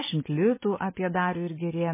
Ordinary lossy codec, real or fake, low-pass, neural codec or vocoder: MP3, 16 kbps; real; 3.6 kHz; none